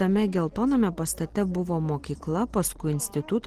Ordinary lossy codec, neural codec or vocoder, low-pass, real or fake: Opus, 16 kbps; none; 14.4 kHz; real